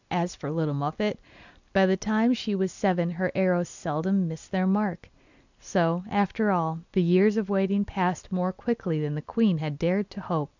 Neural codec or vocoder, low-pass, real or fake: none; 7.2 kHz; real